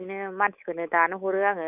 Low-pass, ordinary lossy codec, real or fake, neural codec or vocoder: 3.6 kHz; none; fake; codec, 24 kHz, 3.1 kbps, DualCodec